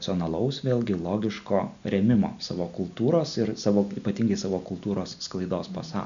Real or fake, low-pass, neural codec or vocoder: real; 7.2 kHz; none